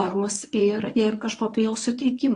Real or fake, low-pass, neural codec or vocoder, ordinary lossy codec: fake; 10.8 kHz; codec, 24 kHz, 0.9 kbps, WavTokenizer, medium speech release version 1; AAC, 48 kbps